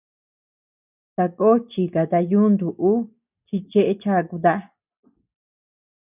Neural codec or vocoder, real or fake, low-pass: none; real; 3.6 kHz